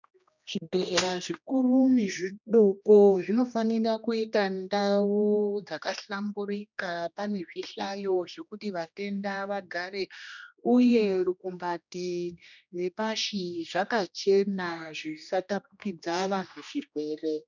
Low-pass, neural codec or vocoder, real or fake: 7.2 kHz; codec, 16 kHz, 1 kbps, X-Codec, HuBERT features, trained on general audio; fake